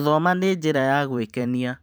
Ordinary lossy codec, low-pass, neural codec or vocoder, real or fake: none; none; vocoder, 44.1 kHz, 128 mel bands every 512 samples, BigVGAN v2; fake